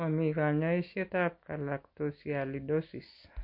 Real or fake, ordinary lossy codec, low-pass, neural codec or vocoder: real; MP3, 32 kbps; 5.4 kHz; none